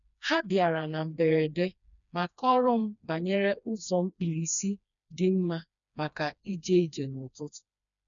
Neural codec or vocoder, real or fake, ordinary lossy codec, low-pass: codec, 16 kHz, 2 kbps, FreqCodec, smaller model; fake; none; 7.2 kHz